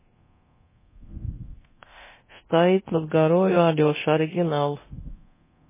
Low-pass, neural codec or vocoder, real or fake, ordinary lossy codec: 3.6 kHz; codec, 24 kHz, 0.9 kbps, DualCodec; fake; MP3, 16 kbps